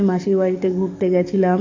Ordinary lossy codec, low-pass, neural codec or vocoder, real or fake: AAC, 48 kbps; 7.2 kHz; codec, 44.1 kHz, 7.8 kbps, DAC; fake